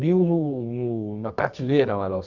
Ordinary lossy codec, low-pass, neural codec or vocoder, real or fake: Opus, 64 kbps; 7.2 kHz; codec, 24 kHz, 0.9 kbps, WavTokenizer, medium music audio release; fake